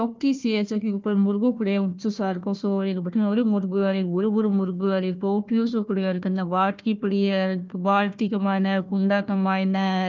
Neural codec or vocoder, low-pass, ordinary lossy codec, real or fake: codec, 16 kHz, 1 kbps, FunCodec, trained on Chinese and English, 50 frames a second; 7.2 kHz; Opus, 32 kbps; fake